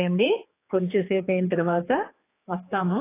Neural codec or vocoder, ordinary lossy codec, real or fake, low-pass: codec, 16 kHz, 4 kbps, X-Codec, HuBERT features, trained on general audio; AAC, 16 kbps; fake; 3.6 kHz